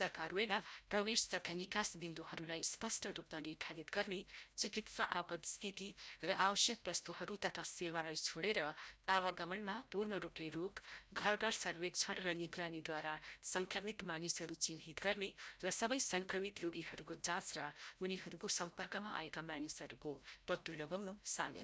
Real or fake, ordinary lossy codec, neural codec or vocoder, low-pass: fake; none; codec, 16 kHz, 0.5 kbps, FreqCodec, larger model; none